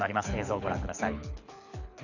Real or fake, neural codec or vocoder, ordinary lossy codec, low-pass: fake; codec, 24 kHz, 6 kbps, HILCodec; none; 7.2 kHz